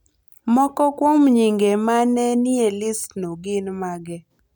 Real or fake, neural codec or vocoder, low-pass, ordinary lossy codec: real; none; none; none